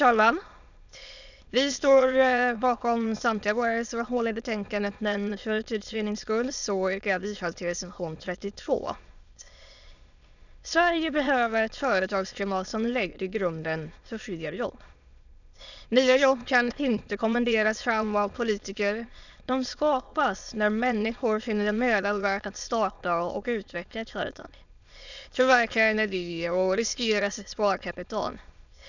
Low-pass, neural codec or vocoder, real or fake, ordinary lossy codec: 7.2 kHz; autoencoder, 22.05 kHz, a latent of 192 numbers a frame, VITS, trained on many speakers; fake; none